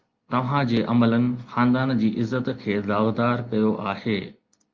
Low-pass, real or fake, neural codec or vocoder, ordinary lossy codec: 7.2 kHz; real; none; Opus, 24 kbps